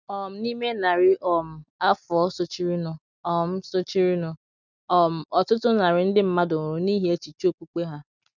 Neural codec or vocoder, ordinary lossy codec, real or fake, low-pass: none; none; real; 7.2 kHz